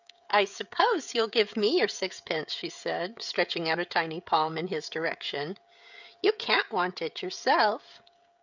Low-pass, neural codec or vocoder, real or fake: 7.2 kHz; codec, 16 kHz, 16 kbps, FreqCodec, smaller model; fake